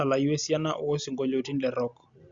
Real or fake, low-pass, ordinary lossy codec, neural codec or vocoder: real; 7.2 kHz; Opus, 64 kbps; none